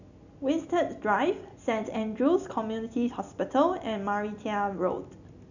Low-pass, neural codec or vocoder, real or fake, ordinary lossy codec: 7.2 kHz; none; real; none